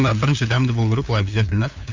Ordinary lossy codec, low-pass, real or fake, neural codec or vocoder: MP3, 64 kbps; 7.2 kHz; fake; codec, 16 kHz, 8 kbps, FunCodec, trained on LibriTTS, 25 frames a second